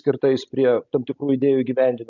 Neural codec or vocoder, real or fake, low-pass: none; real; 7.2 kHz